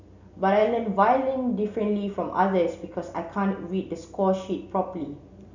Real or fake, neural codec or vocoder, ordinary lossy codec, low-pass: real; none; none; 7.2 kHz